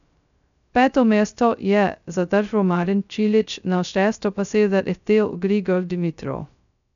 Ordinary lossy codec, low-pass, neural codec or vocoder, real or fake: none; 7.2 kHz; codec, 16 kHz, 0.2 kbps, FocalCodec; fake